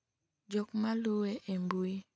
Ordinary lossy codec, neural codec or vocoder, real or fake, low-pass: none; none; real; none